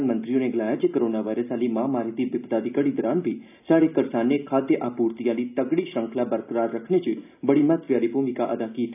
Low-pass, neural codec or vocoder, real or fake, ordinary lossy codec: 3.6 kHz; none; real; none